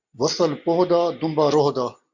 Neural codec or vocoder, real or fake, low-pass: none; real; 7.2 kHz